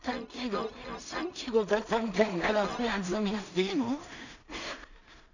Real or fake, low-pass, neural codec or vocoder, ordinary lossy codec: fake; 7.2 kHz; codec, 16 kHz in and 24 kHz out, 0.4 kbps, LongCat-Audio-Codec, two codebook decoder; none